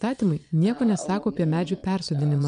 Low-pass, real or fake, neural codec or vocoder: 9.9 kHz; fake; vocoder, 22.05 kHz, 80 mel bands, Vocos